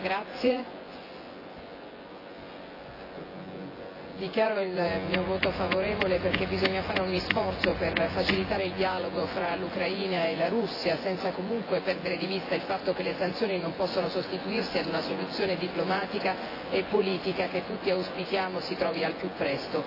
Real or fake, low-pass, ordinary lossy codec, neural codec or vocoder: fake; 5.4 kHz; AAC, 24 kbps; vocoder, 24 kHz, 100 mel bands, Vocos